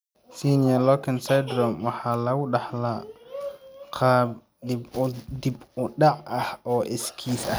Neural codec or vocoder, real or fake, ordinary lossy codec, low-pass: none; real; none; none